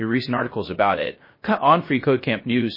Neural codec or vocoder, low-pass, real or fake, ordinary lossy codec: codec, 16 kHz, 0.3 kbps, FocalCodec; 5.4 kHz; fake; MP3, 24 kbps